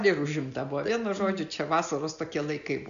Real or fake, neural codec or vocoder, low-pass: real; none; 7.2 kHz